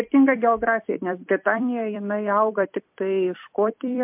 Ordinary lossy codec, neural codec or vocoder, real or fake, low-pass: MP3, 32 kbps; vocoder, 44.1 kHz, 128 mel bands every 256 samples, BigVGAN v2; fake; 3.6 kHz